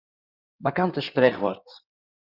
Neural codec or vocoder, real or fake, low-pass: codec, 16 kHz in and 24 kHz out, 2.2 kbps, FireRedTTS-2 codec; fake; 5.4 kHz